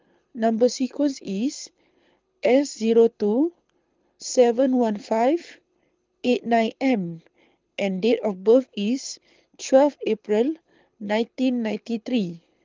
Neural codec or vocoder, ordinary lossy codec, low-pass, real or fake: codec, 24 kHz, 6 kbps, HILCodec; Opus, 32 kbps; 7.2 kHz; fake